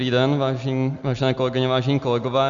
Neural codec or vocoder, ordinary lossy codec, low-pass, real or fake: none; Opus, 64 kbps; 7.2 kHz; real